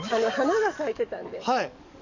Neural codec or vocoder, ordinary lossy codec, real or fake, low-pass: codec, 44.1 kHz, 7.8 kbps, DAC; none; fake; 7.2 kHz